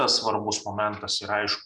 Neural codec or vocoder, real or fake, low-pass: none; real; 10.8 kHz